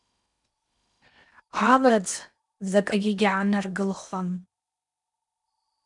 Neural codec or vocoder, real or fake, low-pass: codec, 16 kHz in and 24 kHz out, 0.8 kbps, FocalCodec, streaming, 65536 codes; fake; 10.8 kHz